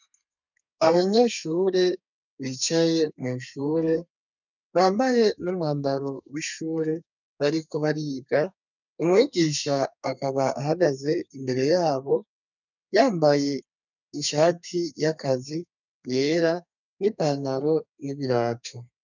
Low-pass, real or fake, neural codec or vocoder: 7.2 kHz; fake; codec, 32 kHz, 1.9 kbps, SNAC